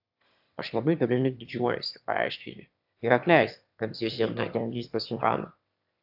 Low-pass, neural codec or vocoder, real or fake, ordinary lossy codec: 5.4 kHz; autoencoder, 22.05 kHz, a latent of 192 numbers a frame, VITS, trained on one speaker; fake; AAC, 48 kbps